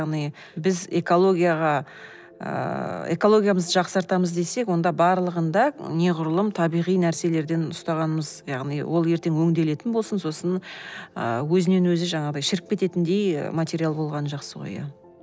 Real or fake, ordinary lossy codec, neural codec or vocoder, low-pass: real; none; none; none